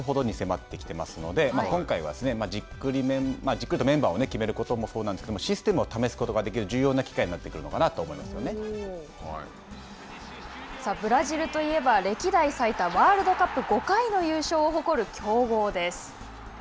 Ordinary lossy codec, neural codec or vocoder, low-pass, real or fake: none; none; none; real